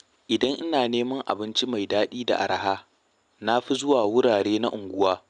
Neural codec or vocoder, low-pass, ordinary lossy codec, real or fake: none; 9.9 kHz; none; real